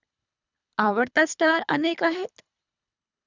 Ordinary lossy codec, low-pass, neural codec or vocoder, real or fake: none; 7.2 kHz; codec, 24 kHz, 3 kbps, HILCodec; fake